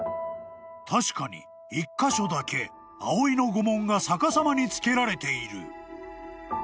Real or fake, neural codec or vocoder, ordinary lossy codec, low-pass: real; none; none; none